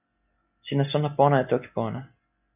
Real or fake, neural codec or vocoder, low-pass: real; none; 3.6 kHz